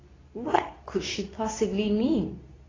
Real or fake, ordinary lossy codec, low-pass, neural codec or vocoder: fake; AAC, 32 kbps; 7.2 kHz; codec, 24 kHz, 0.9 kbps, WavTokenizer, medium speech release version 2